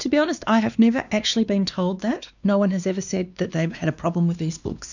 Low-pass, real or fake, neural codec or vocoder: 7.2 kHz; fake; codec, 16 kHz, 2 kbps, X-Codec, WavLM features, trained on Multilingual LibriSpeech